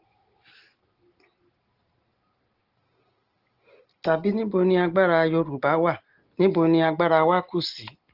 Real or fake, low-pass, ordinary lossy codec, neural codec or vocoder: real; 5.4 kHz; Opus, 16 kbps; none